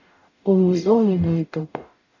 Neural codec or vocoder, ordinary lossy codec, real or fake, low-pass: codec, 44.1 kHz, 0.9 kbps, DAC; none; fake; 7.2 kHz